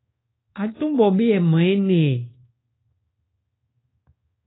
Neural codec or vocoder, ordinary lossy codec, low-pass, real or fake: codec, 24 kHz, 1.2 kbps, DualCodec; AAC, 16 kbps; 7.2 kHz; fake